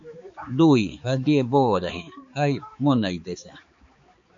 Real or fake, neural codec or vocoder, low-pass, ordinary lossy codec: fake; codec, 16 kHz, 4 kbps, X-Codec, HuBERT features, trained on balanced general audio; 7.2 kHz; MP3, 48 kbps